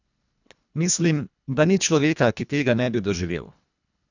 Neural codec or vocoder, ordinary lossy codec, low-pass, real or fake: codec, 24 kHz, 1.5 kbps, HILCodec; none; 7.2 kHz; fake